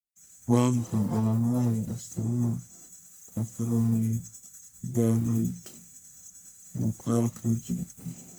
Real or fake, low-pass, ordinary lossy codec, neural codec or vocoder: fake; none; none; codec, 44.1 kHz, 1.7 kbps, Pupu-Codec